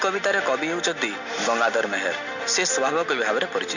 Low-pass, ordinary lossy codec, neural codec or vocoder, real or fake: 7.2 kHz; none; none; real